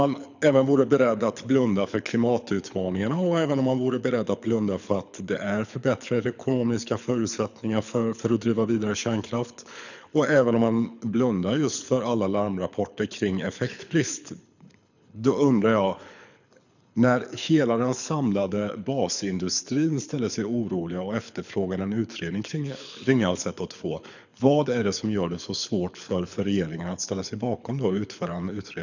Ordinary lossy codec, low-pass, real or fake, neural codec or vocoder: none; 7.2 kHz; fake; codec, 24 kHz, 6 kbps, HILCodec